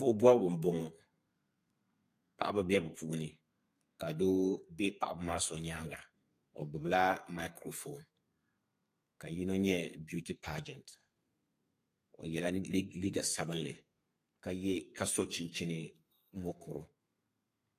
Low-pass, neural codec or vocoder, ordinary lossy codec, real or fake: 14.4 kHz; codec, 32 kHz, 1.9 kbps, SNAC; AAC, 64 kbps; fake